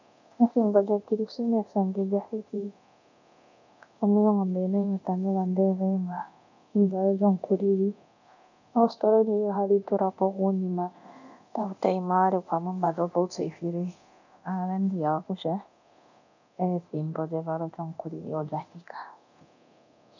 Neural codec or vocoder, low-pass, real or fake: codec, 24 kHz, 0.9 kbps, DualCodec; 7.2 kHz; fake